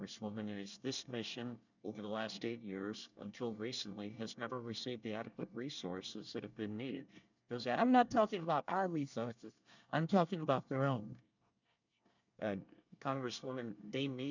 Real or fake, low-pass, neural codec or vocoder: fake; 7.2 kHz; codec, 24 kHz, 1 kbps, SNAC